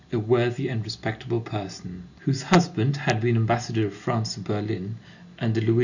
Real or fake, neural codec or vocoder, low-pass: real; none; 7.2 kHz